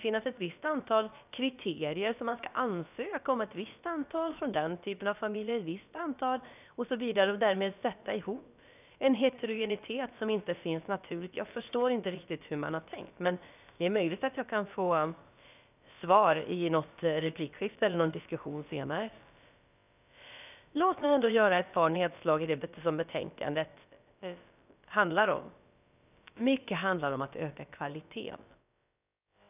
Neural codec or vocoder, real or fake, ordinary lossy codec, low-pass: codec, 16 kHz, about 1 kbps, DyCAST, with the encoder's durations; fake; none; 3.6 kHz